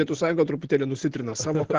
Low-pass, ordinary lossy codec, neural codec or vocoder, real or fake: 7.2 kHz; Opus, 16 kbps; none; real